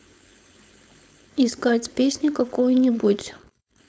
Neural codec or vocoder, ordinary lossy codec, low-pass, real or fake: codec, 16 kHz, 4.8 kbps, FACodec; none; none; fake